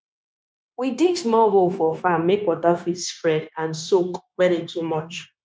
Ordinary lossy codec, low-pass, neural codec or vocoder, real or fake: none; none; codec, 16 kHz, 0.9 kbps, LongCat-Audio-Codec; fake